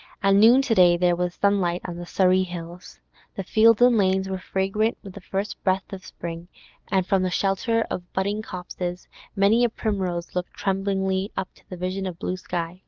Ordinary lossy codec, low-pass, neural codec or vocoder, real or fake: Opus, 32 kbps; 7.2 kHz; none; real